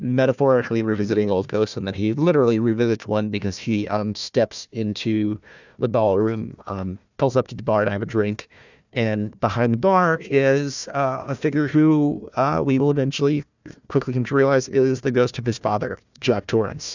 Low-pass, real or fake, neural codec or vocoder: 7.2 kHz; fake; codec, 16 kHz, 1 kbps, FunCodec, trained on Chinese and English, 50 frames a second